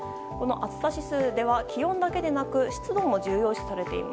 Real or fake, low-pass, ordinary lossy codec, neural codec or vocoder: real; none; none; none